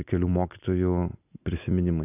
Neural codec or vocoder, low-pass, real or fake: none; 3.6 kHz; real